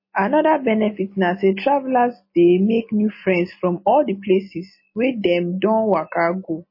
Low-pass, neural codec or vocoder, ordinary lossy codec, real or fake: 5.4 kHz; none; MP3, 24 kbps; real